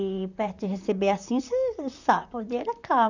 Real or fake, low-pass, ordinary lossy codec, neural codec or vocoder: fake; 7.2 kHz; none; vocoder, 44.1 kHz, 128 mel bands, Pupu-Vocoder